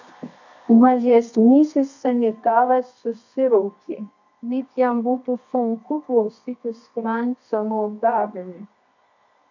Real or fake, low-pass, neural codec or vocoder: fake; 7.2 kHz; codec, 24 kHz, 0.9 kbps, WavTokenizer, medium music audio release